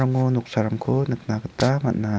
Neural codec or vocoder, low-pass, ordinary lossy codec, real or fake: none; none; none; real